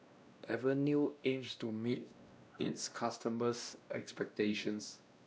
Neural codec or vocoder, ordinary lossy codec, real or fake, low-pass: codec, 16 kHz, 1 kbps, X-Codec, WavLM features, trained on Multilingual LibriSpeech; none; fake; none